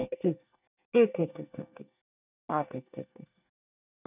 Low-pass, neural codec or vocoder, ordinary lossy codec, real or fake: 3.6 kHz; codec, 24 kHz, 1 kbps, SNAC; none; fake